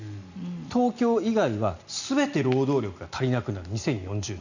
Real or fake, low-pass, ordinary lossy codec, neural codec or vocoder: real; 7.2 kHz; none; none